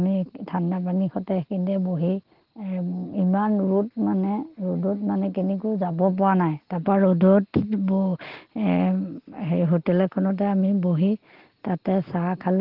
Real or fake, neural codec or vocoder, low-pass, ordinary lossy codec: real; none; 5.4 kHz; Opus, 16 kbps